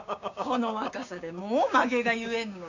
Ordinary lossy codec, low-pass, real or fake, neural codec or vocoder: none; 7.2 kHz; fake; codec, 16 kHz, 6 kbps, DAC